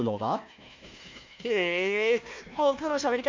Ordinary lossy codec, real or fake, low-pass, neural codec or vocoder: MP3, 48 kbps; fake; 7.2 kHz; codec, 16 kHz, 1 kbps, FunCodec, trained on Chinese and English, 50 frames a second